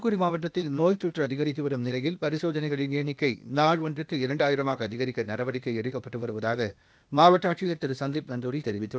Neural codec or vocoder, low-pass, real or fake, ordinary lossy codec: codec, 16 kHz, 0.8 kbps, ZipCodec; none; fake; none